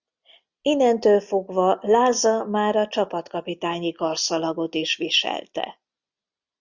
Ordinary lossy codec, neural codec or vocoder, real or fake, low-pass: Opus, 64 kbps; none; real; 7.2 kHz